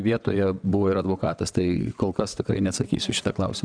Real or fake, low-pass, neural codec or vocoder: fake; 9.9 kHz; vocoder, 22.05 kHz, 80 mel bands, WaveNeXt